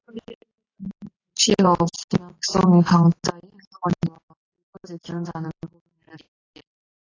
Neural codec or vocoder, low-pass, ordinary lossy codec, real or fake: none; 7.2 kHz; AAC, 32 kbps; real